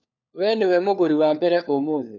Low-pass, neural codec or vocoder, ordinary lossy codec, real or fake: 7.2 kHz; codec, 16 kHz, 4 kbps, FunCodec, trained on LibriTTS, 50 frames a second; none; fake